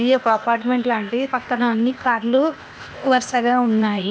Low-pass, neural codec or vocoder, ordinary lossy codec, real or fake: none; codec, 16 kHz, 0.8 kbps, ZipCodec; none; fake